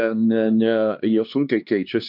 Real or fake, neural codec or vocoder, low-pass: fake; codec, 16 kHz, 2 kbps, X-Codec, HuBERT features, trained on LibriSpeech; 5.4 kHz